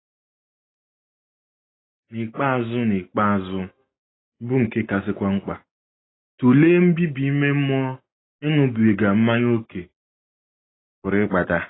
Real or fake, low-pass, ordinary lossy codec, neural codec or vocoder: real; 7.2 kHz; AAC, 16 kbps; none